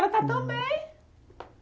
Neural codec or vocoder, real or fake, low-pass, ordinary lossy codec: none; real; none; none